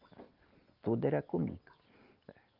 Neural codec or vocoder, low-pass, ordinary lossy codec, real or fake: none; 5.4 kHz; Opus, 32 kbps; real